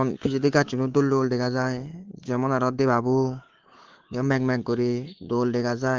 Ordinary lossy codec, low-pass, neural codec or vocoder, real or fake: Opus, 16 kbps; 7.2 kHz; codec, 24 kHz, 3.1 kbps, DualCodec; fake